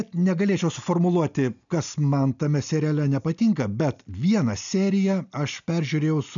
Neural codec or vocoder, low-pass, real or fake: none; 7.2 kHz; real